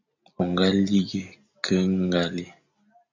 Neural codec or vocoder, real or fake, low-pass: none; real; 7.2 kHz